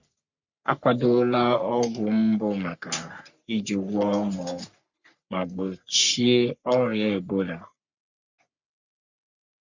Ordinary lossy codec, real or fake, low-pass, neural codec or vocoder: Opus, 64 kbps; fake; 7.2 kHz; codec, 44.1 kHz, 3.4 kbps, Pupu-Codec